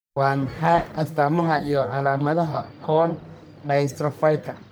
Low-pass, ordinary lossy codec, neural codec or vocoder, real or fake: none; none; codec, 44.1 kHz, 1.7 kbps, Pupu-Codec; fake